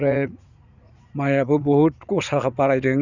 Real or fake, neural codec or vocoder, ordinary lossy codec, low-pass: fake; vocoder, 44.1 kHz, 80 mel bands, Vocos; none; 7.2 kHz